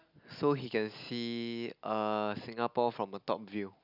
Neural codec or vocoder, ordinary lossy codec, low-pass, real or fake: none; none; 5.4 kHz; real